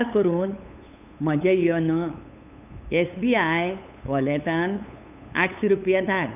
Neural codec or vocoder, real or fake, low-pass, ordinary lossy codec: codec, 16 kHz, 8 kbps, FunCodec, trained on LibriTTS, 25 frames a second; fake; 3.6 kHz; none